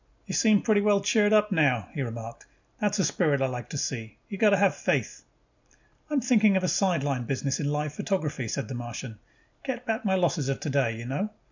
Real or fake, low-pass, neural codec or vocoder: real; 7.2 kHz; none